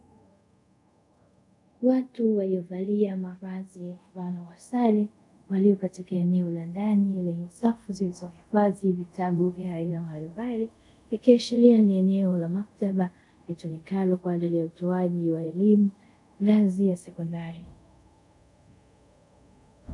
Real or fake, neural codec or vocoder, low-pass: fake; codec, 24 kHz, 0.5 kbps, DualCodec; 10.8 kHz